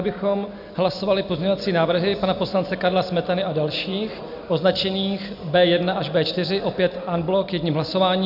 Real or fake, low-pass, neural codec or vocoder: real; 5.4 kHz; none